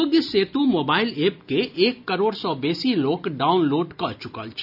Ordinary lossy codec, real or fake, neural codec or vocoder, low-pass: none; real; none; 5.4 kHz